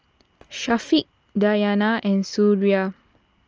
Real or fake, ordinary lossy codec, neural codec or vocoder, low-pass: real; Opus, 24 kbps; none; 7.2 kHz